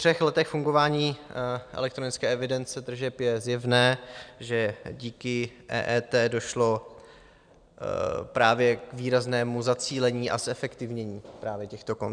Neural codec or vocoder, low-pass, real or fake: vocoder, 44.1 kHz, 128 mel bands every 256 samples, BigVGAN v2; 9.9 kHz; fake